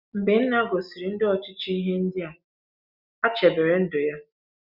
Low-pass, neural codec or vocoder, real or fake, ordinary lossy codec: 5.4 kHz; none; real; Opus, 64 kbps